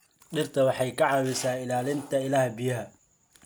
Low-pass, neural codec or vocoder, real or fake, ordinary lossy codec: none; none; real; none